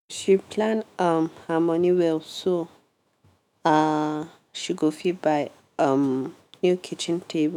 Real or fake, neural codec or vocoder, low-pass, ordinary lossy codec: fake; autoencoder, 48 kHz, 128 numbers a frame, DAC-VAE, trained on Japanese speech; 19.8 kHz; none